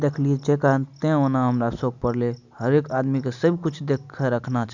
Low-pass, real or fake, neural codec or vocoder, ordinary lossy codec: 7.2 kHz; real; none; none